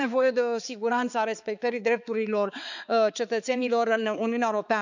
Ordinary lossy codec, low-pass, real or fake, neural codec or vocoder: none; 7.2 kHz; fake; codec, 16 kHz, 4 kbps, X-Codec, HuBERT features, trained on balanced general audio